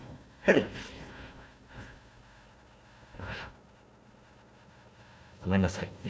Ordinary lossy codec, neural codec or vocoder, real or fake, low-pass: none; codec, 16 kHz, 1 kbps, FunCodec, trained on Chinese and English, 50 frames a second; fake; none